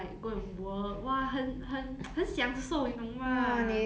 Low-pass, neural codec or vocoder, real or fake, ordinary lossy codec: none; none; real; none